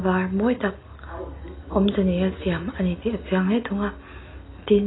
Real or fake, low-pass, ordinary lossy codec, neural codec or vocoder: real; 7.2 kHz; AAC, 16 kbps; none